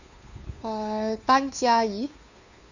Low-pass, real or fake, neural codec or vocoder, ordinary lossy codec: 7.2 kHz; fake; codec, 16 kHz, 2 kbps, FunCodec, trained on Chinese and English, 25 frames a second; none